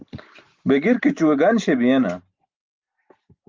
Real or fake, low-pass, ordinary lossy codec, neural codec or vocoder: real; 7.2 kHz; Opus, 32 kbps; none